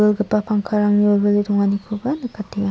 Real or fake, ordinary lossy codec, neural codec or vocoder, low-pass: real; none; none; none